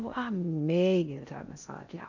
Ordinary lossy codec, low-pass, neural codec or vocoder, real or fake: none; 7.2 kHz; codec, 16 kHz in and 24 kHz out, 0.6 kbps, FocalCodec, streaming, 2048 codes; fake